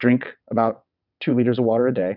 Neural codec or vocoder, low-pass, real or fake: vocoder, 22.05 kHz, 80 mel bands, WaveNeXt; 5.4 kHz; fake